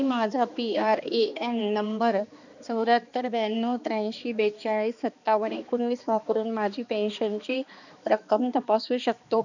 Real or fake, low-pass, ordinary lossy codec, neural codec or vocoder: fake; 7.2 kHz; none; codec, 16 kHz, 2 kbps, X-Codec, HuBERT features, trained on balanced general audio